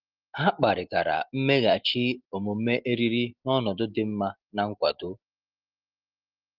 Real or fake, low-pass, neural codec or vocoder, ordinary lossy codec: real; 5.4 kHz; none; Opus, 16 kbps